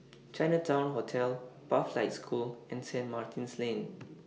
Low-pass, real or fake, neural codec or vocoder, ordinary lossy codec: none; real; none; none